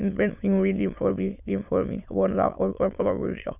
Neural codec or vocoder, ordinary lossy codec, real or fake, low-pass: autoencoder, 22.05 kHz, a latent of 192 numbers a frame, VITS, trained on many speakers; none; fake; 3.6 kHz